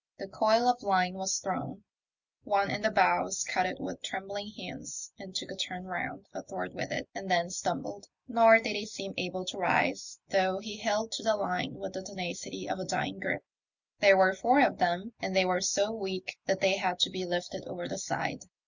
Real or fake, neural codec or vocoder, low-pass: real; none; 7.2 kHz